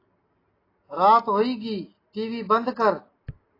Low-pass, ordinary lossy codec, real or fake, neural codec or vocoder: 5.4 kHz; AAC, 24 kbps; real; none